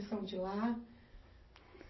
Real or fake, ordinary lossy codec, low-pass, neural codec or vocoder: real; MP3, 24 kbps; 7.2 kHz; none